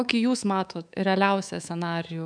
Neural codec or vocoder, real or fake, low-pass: codec, 24 kHz, 3.1 kbps, DualCodec; fake; 9.9 kHz